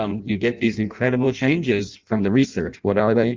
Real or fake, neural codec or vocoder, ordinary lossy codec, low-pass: fake; codec, 16 kHz in and 24 kHz out, 0.6 kbps, FireRedTTS-2 codec; Opus, 24 kbps; 7.2 kHz